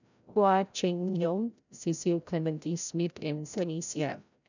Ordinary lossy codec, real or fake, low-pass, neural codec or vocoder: none; fake; 7.2 kHz; codec, 16 kHz, 0.5 kbps, FreqCodec, larger model